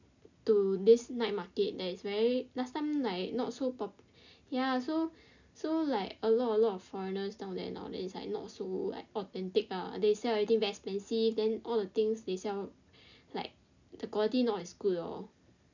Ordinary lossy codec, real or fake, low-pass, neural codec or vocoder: none; real; 7.2 kHz; none